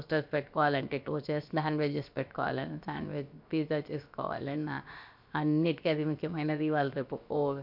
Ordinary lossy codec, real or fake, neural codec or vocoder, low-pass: MP3, 48 kbps; fake; codec, 16 kHz, about 1 kbps, DyCAST, with the encoder's durations; 5.4 kHz